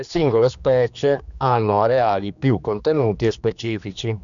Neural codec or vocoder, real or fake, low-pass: codec, 16 kHz, 2 kbps, X-Codec, HuBERT features, trained on general audio; fake; 7.2 kHz